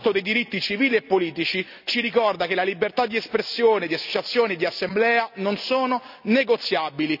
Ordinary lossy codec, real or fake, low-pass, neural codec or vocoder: none; real; 5.4 kHz; none